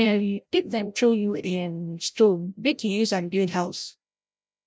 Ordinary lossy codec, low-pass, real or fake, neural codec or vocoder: none; none; fake; codec, 16 kHz, 0.5 kbps, FreqCodec, larger model